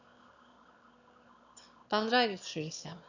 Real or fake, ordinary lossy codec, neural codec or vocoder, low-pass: fake; none; autoencoder, 22.05 kHz, a latent of 192 numbers a frame, VITS, trained on one speaker; 7.2 kHz